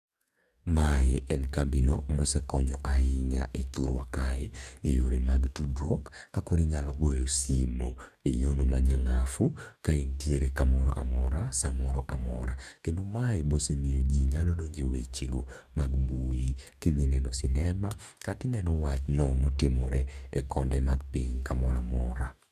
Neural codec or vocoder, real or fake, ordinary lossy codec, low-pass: codec, 44.1 kHz, 2.6 kbps, DAC; fake; none; 14.4 kHz